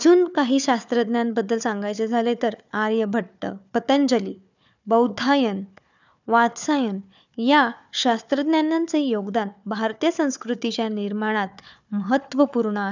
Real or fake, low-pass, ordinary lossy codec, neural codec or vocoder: fake; 7.2 kHz; none; codec, 16 kHz, 4 kbps, FunCodec, trained on Chinese and English, 50 frames a second